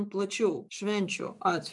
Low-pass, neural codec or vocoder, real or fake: 10.8 kHz; vocoder, 24 kHz, 100 mel bands, Vocos; fake